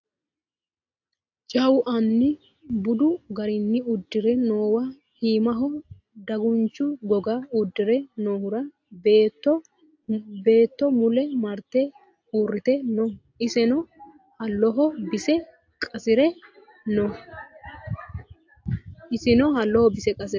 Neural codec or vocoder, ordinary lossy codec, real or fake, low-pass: none; AAC, 48 kbps; real; 7.2 kHz